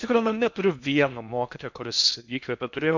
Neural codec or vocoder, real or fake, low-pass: codec, 16 kHz in and 24 kHz out, 0.8 kbps, FocalCodec, streaming, 65536 codes; fake; 7.2 kHz